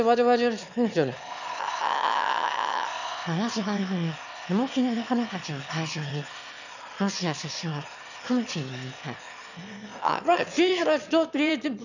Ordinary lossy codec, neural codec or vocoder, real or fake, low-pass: none; autoencoder, 22.05 kHz, a latent of 192 numbers a frame, VITS, trained on one speaker; fake; 7.2 kHz